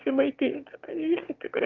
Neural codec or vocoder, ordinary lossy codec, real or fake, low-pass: autoencoder, 22.05 kHz, a latent of 192 numbers a frame, VITS, trained on one speaker; Opus, 24 kbps; fake; 7.2 kHz